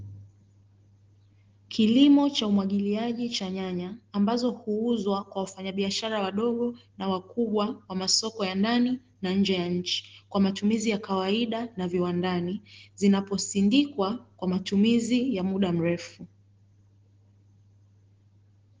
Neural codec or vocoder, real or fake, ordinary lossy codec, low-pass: none; real; Opus, 16 kbps; 7.2 kHz